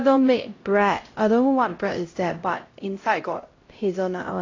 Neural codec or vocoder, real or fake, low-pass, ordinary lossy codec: codec, 16 kHz, 0.5 kbps, X-Codec, HuBERT features, trained on LibriSpeech; fake; 7.2 kHz; AAC, 32 kbps